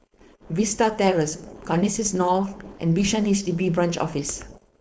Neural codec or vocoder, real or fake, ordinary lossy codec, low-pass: codec, 16 kHz, 4.8 kbps, FACodec; fake; none; none